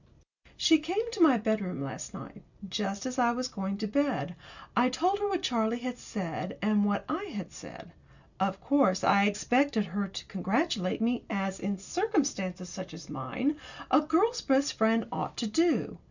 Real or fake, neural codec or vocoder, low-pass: real; none; 7.2 kHz